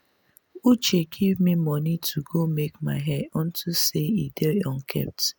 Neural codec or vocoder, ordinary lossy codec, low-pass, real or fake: none; none; none; real